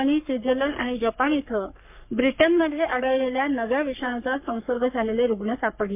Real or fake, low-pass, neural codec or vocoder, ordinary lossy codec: fake; 3.6 kHz; codec, 44.1 kHz, 3.4 kbps, Pupu-Codec; MP3, 32 kbps